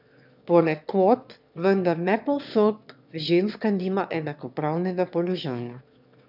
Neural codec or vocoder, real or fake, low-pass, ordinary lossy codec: autoencoder, 22.05 kHz, a latent of 192 numbers a frame, VITS, trained on one speaker; fake; 5.4 kHz; none